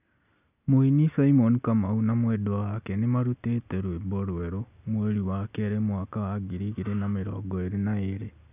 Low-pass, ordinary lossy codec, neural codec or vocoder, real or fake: 3.6 kHz; none; none; real